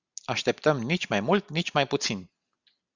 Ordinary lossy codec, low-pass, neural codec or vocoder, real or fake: Opus, 64 kbps; 7.2 kHz; none; real